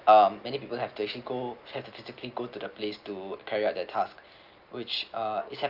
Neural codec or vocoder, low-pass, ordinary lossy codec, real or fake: none; 5.4 kHz; Opus, 24 kbps; real